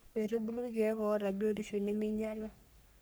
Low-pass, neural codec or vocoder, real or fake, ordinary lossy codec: none; codec, 44.1 kHz, 3.4 kbps, Pupu-Codec; fake; none